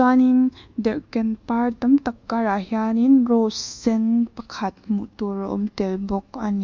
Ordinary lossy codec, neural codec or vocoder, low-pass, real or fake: Opus, 64 kbps; codec, 24 kHz, 1.2 kbps, DualCodec; 7.2 kHz; fake